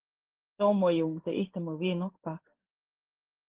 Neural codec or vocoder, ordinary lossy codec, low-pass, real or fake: codec, 16 kHz in and 24 kHz out, 1 kbps, XY-Tokenizer; Opus, 16 kbps; 3.6 kHz; fake